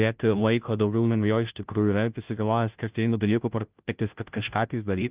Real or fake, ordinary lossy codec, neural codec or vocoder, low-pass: fake; Opus, 32 kbps; codec, 16 kHz, 0.5 kbps, FunCodec, trained on Chinese and English, 25 frames a second; 3.6 kHz